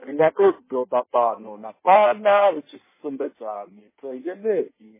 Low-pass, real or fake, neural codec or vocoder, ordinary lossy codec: 3.6 kHz; fake; codec, 16 kHz in and 24 kHz out, 1.1 kbps, FireRedTTS-2 codec; MP3, 16 kbps